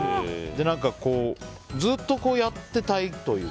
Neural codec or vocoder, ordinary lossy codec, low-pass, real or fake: none; none; none; real